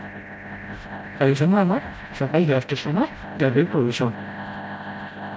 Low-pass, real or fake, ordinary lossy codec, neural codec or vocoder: none; fake; none; codec, 16 kHz, 0.5 kbps, FreqCodec, smaller model